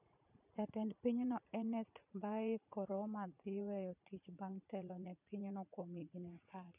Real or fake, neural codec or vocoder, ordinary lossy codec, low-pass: fake; vocoder, 44.1 kHz, 80 mel bands, Vocos; none; 3.6 kHz